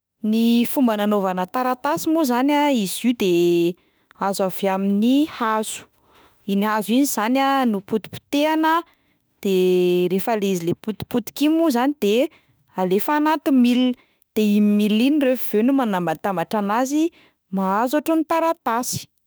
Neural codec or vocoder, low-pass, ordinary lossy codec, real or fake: autoencoder, 48 kHz, 32 numbers a frame, DAC-VAE, trained on Japanese speech; none; none; fake